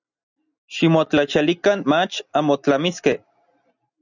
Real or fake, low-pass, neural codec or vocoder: real; 7.2 kHz; none